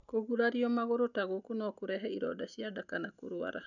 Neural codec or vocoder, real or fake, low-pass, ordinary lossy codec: none; real; 7.2 kHz; none